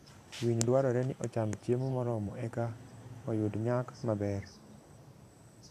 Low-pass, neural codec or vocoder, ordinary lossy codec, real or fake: 14.4 kHz; vocoder, 44.1 kHz, 128 mel bands every 512 samples, BigVGAN v2; none; fake